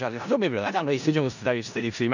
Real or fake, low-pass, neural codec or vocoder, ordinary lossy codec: fake; 7.2 kHz; codec, 16 kHz in and 24 kHz out, 0.4 kbps, LongCat-Audio-Codec, four codebook decoder; none